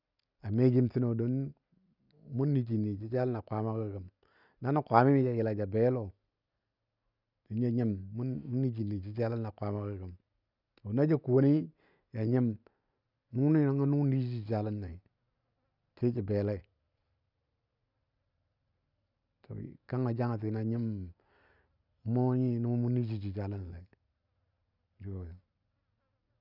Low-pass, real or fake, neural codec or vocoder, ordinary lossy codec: 5.4 kHz; real; none; none